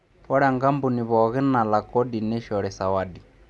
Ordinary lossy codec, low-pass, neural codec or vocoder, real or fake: none; none; none; real